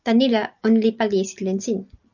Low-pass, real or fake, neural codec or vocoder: 7.2 kHz; real; none